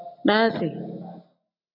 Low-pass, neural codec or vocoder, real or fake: 5.4 kHz; none; real